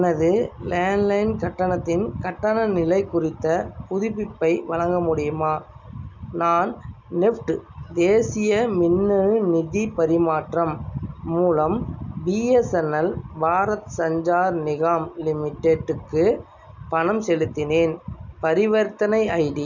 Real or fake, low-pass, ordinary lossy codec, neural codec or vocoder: real; 7.2 kHz; none; none